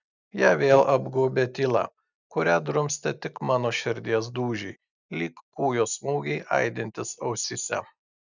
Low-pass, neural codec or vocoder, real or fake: 7.2 kHz; none; real